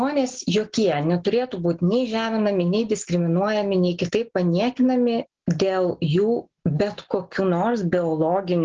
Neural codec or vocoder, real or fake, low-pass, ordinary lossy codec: none; real; 7.2 kHz; Opus, 16 kbps